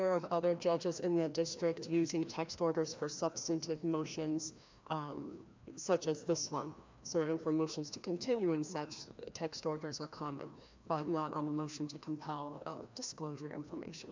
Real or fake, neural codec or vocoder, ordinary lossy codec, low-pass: fake; codec, 16 kHz, 1 kbps, FreqCodec, larger model; MP3, 64 kbps; 7.2 kHz